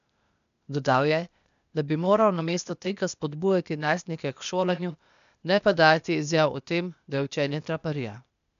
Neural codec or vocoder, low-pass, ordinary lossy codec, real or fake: codec, 16 kHz, 0.8 kbps, ZipCodec; 7.2 kHz; none; fake